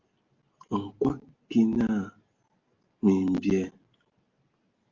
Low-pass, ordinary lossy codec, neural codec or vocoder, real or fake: 7.2 kHz; Opus, 32 kbps; none; real